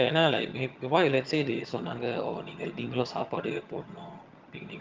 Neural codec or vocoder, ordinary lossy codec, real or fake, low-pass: vocoder, 22.05 kHz, 80 mel bands, HiFi-GAN; Opus, 32 kbps; fake; 7.2 kHz